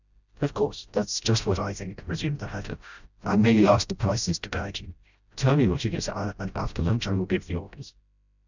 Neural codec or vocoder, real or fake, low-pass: codec, 16 kHz, 0.5 kbps, FreqCodec, smaller model; fake; 7.2 kHz